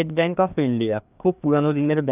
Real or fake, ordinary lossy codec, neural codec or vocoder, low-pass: fake; none; codec, 16 kHz, 2 kbps, FreqCodec, larger model; 3.6 kHz